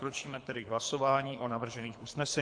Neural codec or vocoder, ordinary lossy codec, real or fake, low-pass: codec, 24 kHz, 3 kbps, HILCodec; AAC, 64 kbps; fake; 9.9 kHz